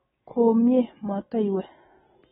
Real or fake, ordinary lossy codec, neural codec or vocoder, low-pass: real; AAC, 16 kbps; none; 9.9 kHz